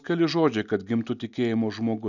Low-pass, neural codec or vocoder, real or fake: 7.2 kHz; none; real